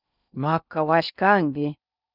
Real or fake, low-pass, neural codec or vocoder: fake; 5.4 kHz; codec, 16 kHz in and 24 kHz out, 0.6 kbps, FocalCodec, streaming, 2048 codes